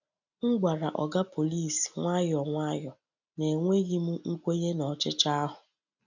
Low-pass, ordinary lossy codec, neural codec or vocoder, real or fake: 7.2 kHz; none; none; real